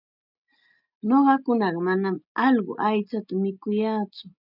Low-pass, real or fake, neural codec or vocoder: 5.4 kHz; real; none